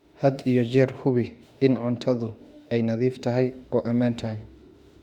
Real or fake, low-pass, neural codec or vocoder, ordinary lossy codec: fake; 19.8 kHz; autoencoder, 48 kHz, 32 numbers a frame, DAC-VAE, trained on Japanese speech; Opus, 64 kbps